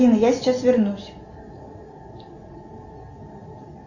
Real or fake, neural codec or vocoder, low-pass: real; none; 7.2 kHz